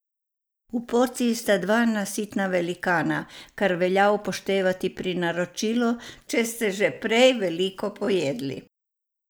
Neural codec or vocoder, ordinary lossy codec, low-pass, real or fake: none; none; none; real